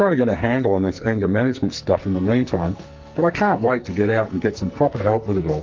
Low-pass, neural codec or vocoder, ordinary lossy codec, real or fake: 7.2 kHz; codec, 44.1 kHz, 2.6 kbps, SNAC; Opus, 24 kbps; fake